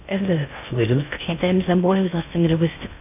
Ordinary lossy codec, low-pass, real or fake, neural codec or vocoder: none; 3.6 kHz; fake; codec, 16 kHz in and 24 kHz out, 0.6 kbps, FocalCodec, streaming, 2048 codes